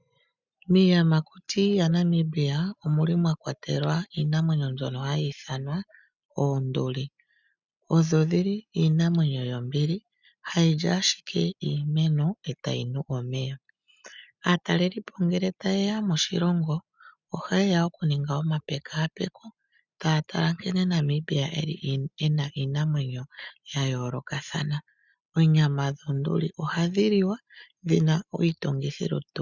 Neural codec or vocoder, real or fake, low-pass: none; real; 7.2 kHz